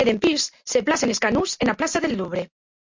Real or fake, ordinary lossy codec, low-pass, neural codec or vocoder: real; MP3, 64 kbps; 7.2 kHz; none